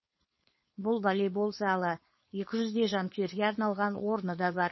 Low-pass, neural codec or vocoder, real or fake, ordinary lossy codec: 7.2 kHz; codec, 16 kHz, 4.8 kbps, FACodec; fake; MP3, 24 kbps